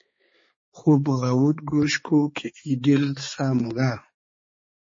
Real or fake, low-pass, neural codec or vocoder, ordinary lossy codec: fake; 7.2 kHz; codec, 16 kHz, 4 kbps, X-Codec, HuBERT features, trained on balanced general audio; MP3, 32 kbps